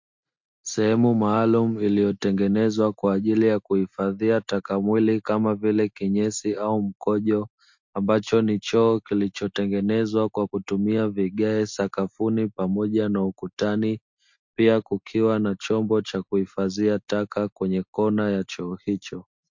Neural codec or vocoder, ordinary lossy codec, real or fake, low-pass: none; MP3, 48 kbps; real; 7.2 kHz